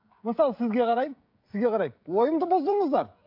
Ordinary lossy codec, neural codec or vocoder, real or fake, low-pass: AAC, 48 kbps; codec, 16 kHz, 16 kbps, FreqCodec, smaller model; fake; 5.4 kHz